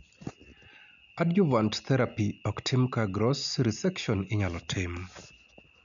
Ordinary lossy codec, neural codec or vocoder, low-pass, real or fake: none; none; 7.2 kHz; real